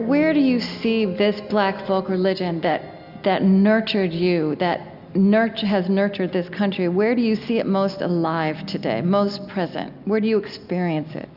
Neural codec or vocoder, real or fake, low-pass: none; real; 5.4 kHz